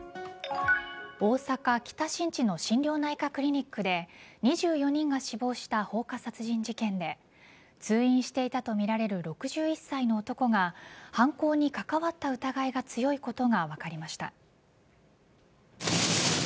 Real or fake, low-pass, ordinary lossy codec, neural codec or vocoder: real; none; none; none